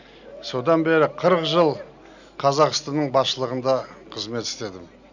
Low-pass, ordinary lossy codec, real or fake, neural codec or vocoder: 7.2 kHz; none; real; none